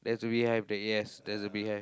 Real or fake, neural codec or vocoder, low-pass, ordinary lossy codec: real; none; none; none